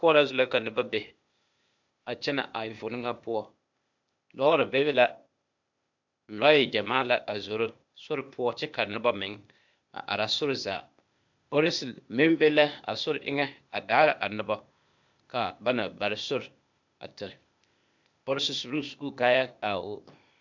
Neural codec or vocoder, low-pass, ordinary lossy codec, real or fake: codec, 16 kHz, 0.8 kbps, ZipCodec; 7.2 kHz; MP3, 64 kbps; fake